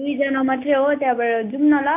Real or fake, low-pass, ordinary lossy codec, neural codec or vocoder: real; 3.6 kHz; MP3, 24 kbps; none